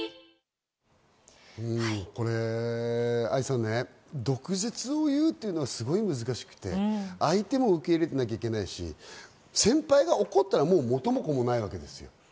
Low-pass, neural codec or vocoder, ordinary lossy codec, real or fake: none; none; none; real